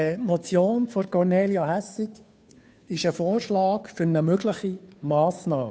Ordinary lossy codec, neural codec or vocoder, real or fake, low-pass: none; codec, 16 kHz, 2 kbps, FunCodec, trained on Chinese and English, 25 frames a second; fake; none